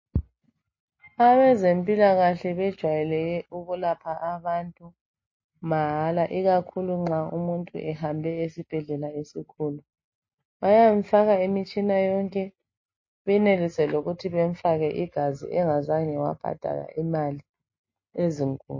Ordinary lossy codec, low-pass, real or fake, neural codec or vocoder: MP3, 32 kbps; 7.2 kHz; real; none